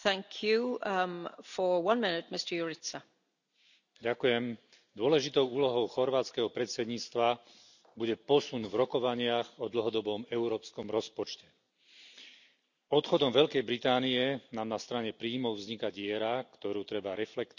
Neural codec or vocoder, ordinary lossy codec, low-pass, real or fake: none; none; 7.2 kHz; real